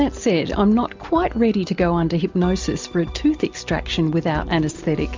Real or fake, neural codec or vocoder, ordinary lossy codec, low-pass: real; none; MP3, 64 kbps; 7.2 kHz